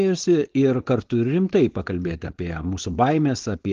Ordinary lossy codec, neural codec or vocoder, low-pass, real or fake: Opus, 16 kbps; codec, 16 kHz, 4.8 kbps, FACodec; 7.2 kHz; fake